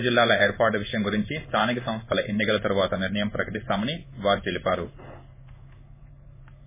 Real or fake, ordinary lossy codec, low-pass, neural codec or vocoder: fake; MP3, 16 kbps; 3.6 kHz; codec, 16 kHz in and 24 kHz out, 1 kbps, XY-Tokenizer